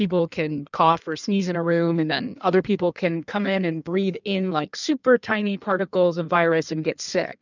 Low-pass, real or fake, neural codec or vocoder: 7.2 kHz; fake; codec, 16 kHz in and 24 kHz out, 1.1 kbps, FireRedTTS-2 codec